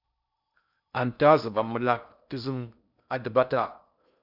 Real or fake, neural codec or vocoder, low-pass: fake; codec, 16 kHz in and 24 kHz out, 0.6 kbps, FocalCodec, streaming, 4096 codes; 5.4 kHz